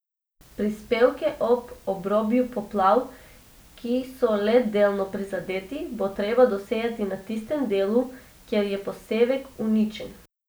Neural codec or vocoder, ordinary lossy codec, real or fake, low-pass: none; none; real; none